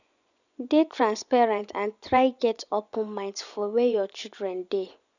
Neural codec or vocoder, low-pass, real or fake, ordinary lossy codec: vocoder, 24 kHz, 100 mel bands, Vocos; 7.2 kHz; fake; none